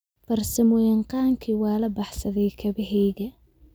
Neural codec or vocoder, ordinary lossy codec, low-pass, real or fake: none; none; none; real